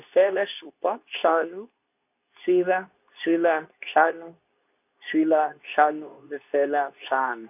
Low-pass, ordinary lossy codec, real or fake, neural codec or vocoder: 3.6 kHz; none; fake; codec, 24 kHz, 0.9 kbps, WavTokenizer, medium speech release version 2